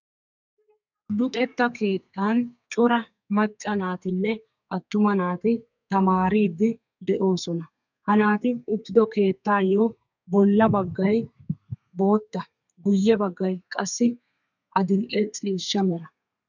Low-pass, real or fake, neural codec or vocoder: 7.2 kHz; fake; codec, 32 kHz, 1.9 kbps, SNAC